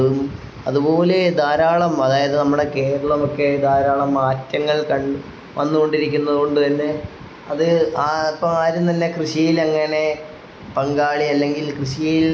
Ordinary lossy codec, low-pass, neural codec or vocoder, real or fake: none; none; none; real